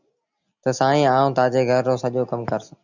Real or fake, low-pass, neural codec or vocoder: real; 7.2 kHz; none